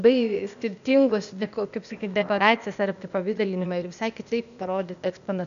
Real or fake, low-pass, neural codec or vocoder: fake; 7.2 kHz; codec, 16 kHz, 0.8 kbps, ZipCodec